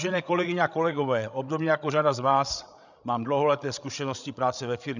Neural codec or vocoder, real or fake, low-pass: codec, 16 kHz, 16 kbps, FreqCodec, larger model; fake; 7.2 kHz